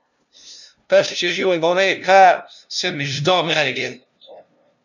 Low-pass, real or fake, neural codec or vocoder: 7.2 kHz; fake; codec, 16 kHz, 0.5 kbps, FunCodec, trained on LibriTTS, 25 frames a second